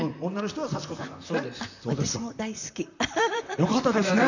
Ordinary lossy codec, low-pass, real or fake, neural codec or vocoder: none; 7.2 kHz; fake; vocoder, 44.1 kHz, 128 mel bands, Pupu-Vocoder